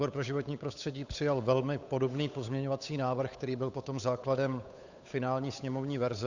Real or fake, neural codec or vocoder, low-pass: fake; codec, 16 kHz, 8 kbps, FunCodec, trained on Chinese and English, 25 frames a second; 7.2 kHz